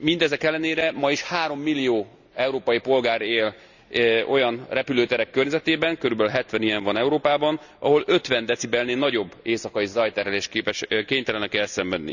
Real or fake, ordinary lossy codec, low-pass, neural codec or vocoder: real; none; 7.2 kHz; none